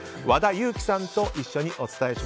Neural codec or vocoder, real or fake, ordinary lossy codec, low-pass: none; real; none; none